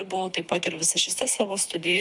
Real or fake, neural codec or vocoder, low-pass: fake; codec, 24 kHz, 3 kbps, HILCodec; 10.8 kHz